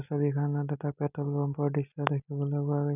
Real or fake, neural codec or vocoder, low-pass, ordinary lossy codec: real; none; 3.6 kHz; none